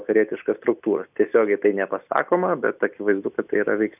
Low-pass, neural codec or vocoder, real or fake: 3.6 kHz; none; real